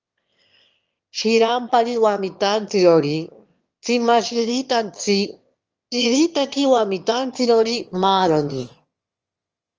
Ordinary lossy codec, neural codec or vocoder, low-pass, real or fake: Opus, 24 kbps; autoencoder, 22.05 kHz, a latent of 192 numbers a frame, VITS, trained on one speaker; 7.2 kHz; fake